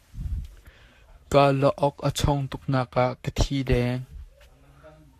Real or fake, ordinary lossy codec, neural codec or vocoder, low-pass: fake; AAC, 64 kbps; codec, 44.1 kHz, 7.8 kbps, Pupu-Codec; 14.4 kHz